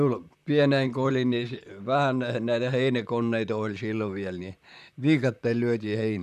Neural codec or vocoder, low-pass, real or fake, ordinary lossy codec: vocoder, 44.1 kHz, 128 mel bands, Pupu-Vocoder; 14.4 kHz; fake; none